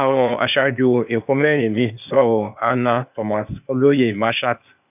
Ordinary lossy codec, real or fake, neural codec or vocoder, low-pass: none; fake; codec, 16 kHz, 0.8 kbps, ZipCodec; 3.6 kHz